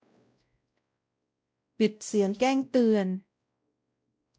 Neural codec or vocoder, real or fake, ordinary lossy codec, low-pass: codec, 16 kHz, 0.5 kbps, X-Codec, WavLM features, trained on Multilingual LibriSpeech; fake; none; none